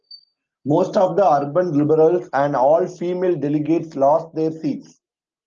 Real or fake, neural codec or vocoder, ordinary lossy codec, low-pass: real; none; Opus, 24 kbps; 7.2 kHz